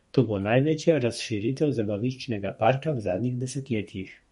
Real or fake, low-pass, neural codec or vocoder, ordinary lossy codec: fake; 14.4 kHz; codec, 32 kHz, 1.9 kbps, SNAC; MP3, 48 kbps